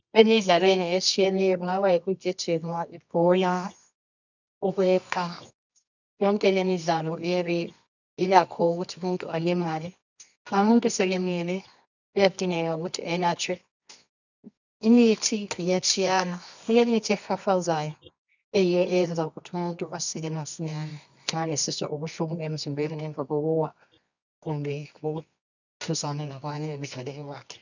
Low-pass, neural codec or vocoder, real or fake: 7.2 kHz; codec, 24 kHz, 0.9 kbps, WavTokenizer, medium music audio release; fake